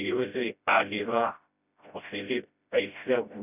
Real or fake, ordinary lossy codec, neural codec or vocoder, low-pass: fake; none; codec, 16 kHz, 0.5 kbps, FreqCodec, smaller model; 3.6 kHz